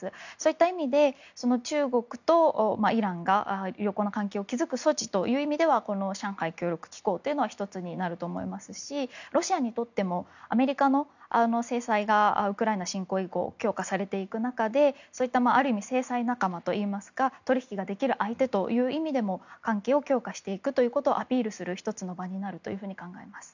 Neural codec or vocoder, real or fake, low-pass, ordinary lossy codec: none; real; 7.2 kHz; none